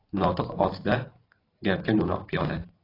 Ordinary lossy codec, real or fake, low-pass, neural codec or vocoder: AAC, 24 kbps; fake; 5.4 kHz; vocoder, 44.1 kHz, 128 mel bands every 512 samples, BigVGAN v2